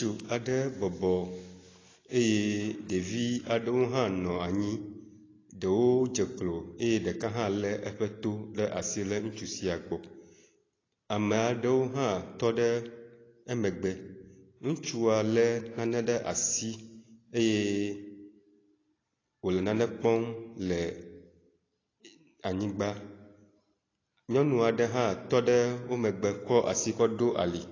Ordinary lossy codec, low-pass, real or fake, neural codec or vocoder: AAC, 32 kbps; 7.2 kHz; real; none